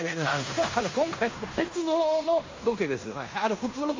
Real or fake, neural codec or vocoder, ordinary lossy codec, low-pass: fake; codec, 16 kHz in and 24 kHz out, 0.9 kbps, LongCat-Audio-Codec, fine tuned four codebook decoder; MP3, 32 kbps; 7.2 kHz